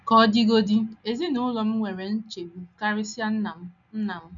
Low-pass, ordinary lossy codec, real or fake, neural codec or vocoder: 7.2 kHz; none; real; none